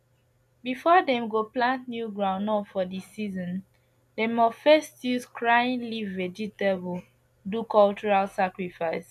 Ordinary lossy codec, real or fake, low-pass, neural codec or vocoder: none; real; 14.4 kHz; none